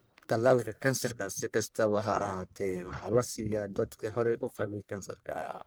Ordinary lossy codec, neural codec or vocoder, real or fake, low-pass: none; codec, 44.1 kHz, 1.7 kbps, Pupu-Codec; fake; none